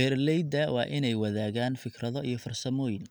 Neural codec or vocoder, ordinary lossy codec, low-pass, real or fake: none; none; none; real